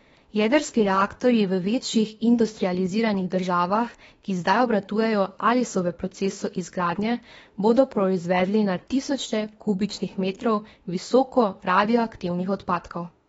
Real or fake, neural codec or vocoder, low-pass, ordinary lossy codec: fake; autoencoder, 48 kHz, 32 numbers a frame, DAC-VAE, trained on Japanese speech; 19.8 kHz; AAC, 24 kbps